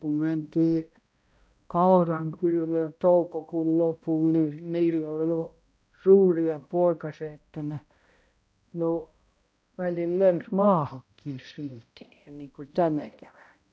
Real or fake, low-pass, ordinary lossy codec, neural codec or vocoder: fake; none; none; codec, 16 kHz, 0.5 kbps, X-Codec, HuBERT features, trained on balanced general audio